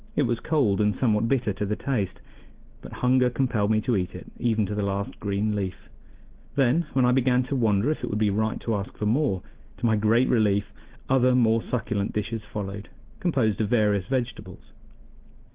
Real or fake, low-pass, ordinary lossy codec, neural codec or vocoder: real; 3.6 kHz; Opus, 16 kbps; none